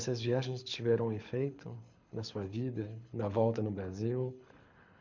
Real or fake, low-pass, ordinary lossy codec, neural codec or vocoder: fake; 7.2 kHz; MP3, 64 kbps; codec, 24 kHz, 6 kbps, HILCodec